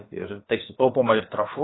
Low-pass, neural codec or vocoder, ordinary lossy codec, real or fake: 7.2 kHz; codec, 16 kHz, about 1 kbps, DyCAST, with the encoder's durations; AAC, 16 kbps; fake